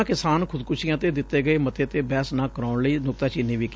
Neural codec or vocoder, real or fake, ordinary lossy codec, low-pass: none; real; none; none